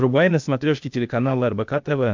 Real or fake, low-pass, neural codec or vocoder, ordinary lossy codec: fake; 7.2 kHz; codec, 16 kHz, 0.8 kbps, ZipCodec; MP3, 64 kbps